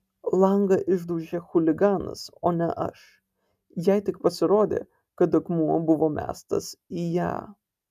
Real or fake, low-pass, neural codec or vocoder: real; 14.4 kHz; none